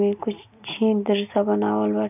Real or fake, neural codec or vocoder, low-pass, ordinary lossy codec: real; none; 3.6 kHz; none